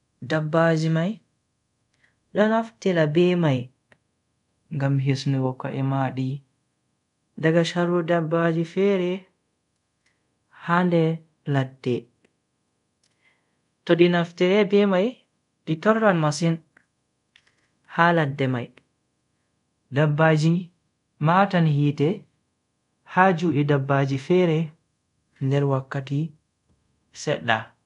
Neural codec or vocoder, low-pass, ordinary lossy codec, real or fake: codec, 24 kHz, 0.5 kbps, DualCodec; 10.8 kHz; none; fake